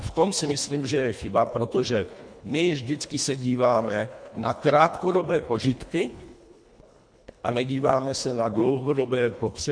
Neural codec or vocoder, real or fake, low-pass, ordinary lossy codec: codec, 24 kHz, 1.5 kbps, HILCodec; fake; 9.9 kHz; MP3, 64 kbps